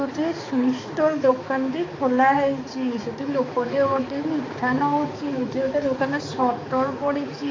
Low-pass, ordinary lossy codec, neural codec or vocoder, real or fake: 7.2 kHz; AAC, 32 kbps; codec, 16 kHz, 4 kbps, X-Codec, HuBERT features, trained on balanced general audio; fake